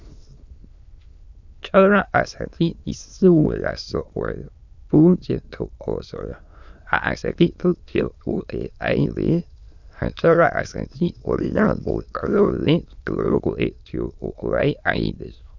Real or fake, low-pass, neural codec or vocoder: fake; 7.2 kHz; autoencoder, 22.05 kHz, a latent of 192 numbers a frame, VITS, trained on many speakers